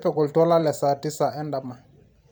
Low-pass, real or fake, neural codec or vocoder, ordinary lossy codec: none; fake; vocoder, 44.1 kHz, 128 mel bands every 256 samples, BigVGAN v2; none